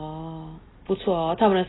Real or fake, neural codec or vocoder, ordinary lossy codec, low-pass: real; none; AAC, 16 kbps; 7.2 kHz